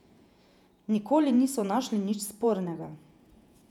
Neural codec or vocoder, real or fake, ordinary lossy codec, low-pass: vocoder, 48 kHz, 128 mel bands, Vocos; fake; none; 19.8 kHz